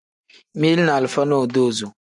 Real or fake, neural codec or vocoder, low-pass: real; none; 10.8 kHz